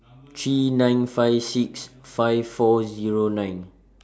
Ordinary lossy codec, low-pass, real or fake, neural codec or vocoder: none; none; real; none